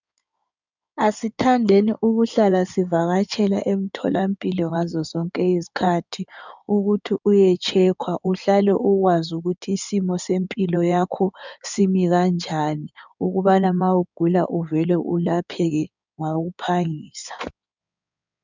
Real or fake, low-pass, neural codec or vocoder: fake; 7.2 kHz; codec, 16 kHz in and 24 kHz out, 2.2 kbps, FireRedTTS-2 codec